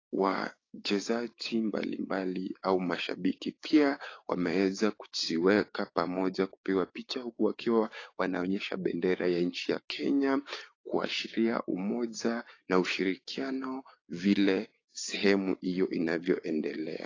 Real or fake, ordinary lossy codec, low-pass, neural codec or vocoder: fake; AAC, 32 kbps; 7.2 kHz; codec, 16 kHz, 6 kbps, DAC